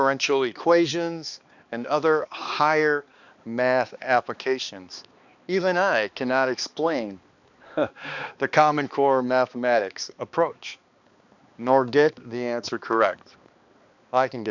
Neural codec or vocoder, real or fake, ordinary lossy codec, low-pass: codec, 16 kHz, 2 kbps, X-Codec, HuBERT features, trained on balanced general audio; fake; Opus, 64 kbps; 7.2 kHz